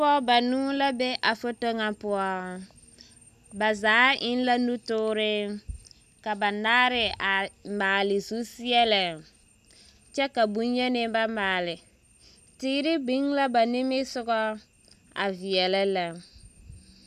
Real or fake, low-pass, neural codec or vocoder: real; 14.4 kHz; none